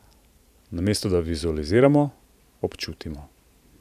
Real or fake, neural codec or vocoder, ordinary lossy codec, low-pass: fake; vocoder, 44.1 kHz, 128 mel bands every 512 samples, BigVGAN v2; none; 14.4 kHz